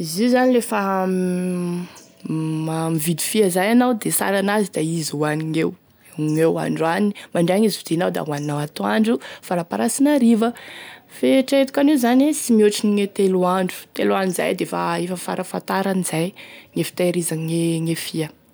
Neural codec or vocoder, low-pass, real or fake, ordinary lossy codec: none; none; real; none